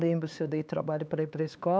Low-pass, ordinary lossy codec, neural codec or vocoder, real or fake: none; none; codec, 16 kHz, 4 kbps, X-Codec, HuBERT features, trained on LibriSpeech; fake